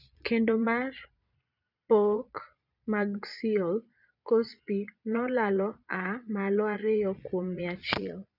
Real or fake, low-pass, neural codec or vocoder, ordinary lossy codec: fake; 5.4 kHz; vocoder, 22.05 kHz, 80 mel bands, Vocos; none